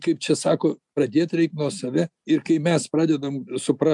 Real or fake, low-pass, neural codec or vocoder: real; 10.8 kHz; none